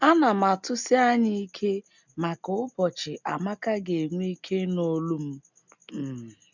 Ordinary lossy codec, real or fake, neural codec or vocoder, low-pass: none; real; none; 7.2 kHz